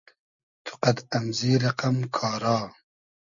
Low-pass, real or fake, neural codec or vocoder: 7.2 kHz; real; none